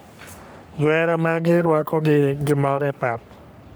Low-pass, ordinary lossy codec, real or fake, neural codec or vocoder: none; none; fake; codec, 44.1 kHz, 3.4 kbps, Pupu-Codec